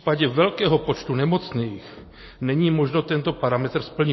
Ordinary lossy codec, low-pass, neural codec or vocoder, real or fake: MP3, 24 kbps; 7.2 kHz; none; real